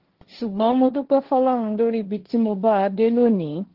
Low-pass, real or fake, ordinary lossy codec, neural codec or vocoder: 5.4 kHz; fake; Opus, 16 kbps; codec, 16 kHz, 1.1 kbps, Voila-Tokenizer